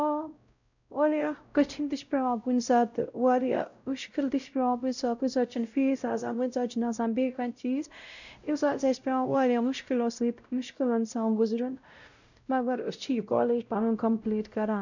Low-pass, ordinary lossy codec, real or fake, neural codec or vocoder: 7.2 kHz; none; fake; codec, 16 kHz, 0.5 kbps, X-Codec, WavLM features, trained on Multilingual LibriSpeech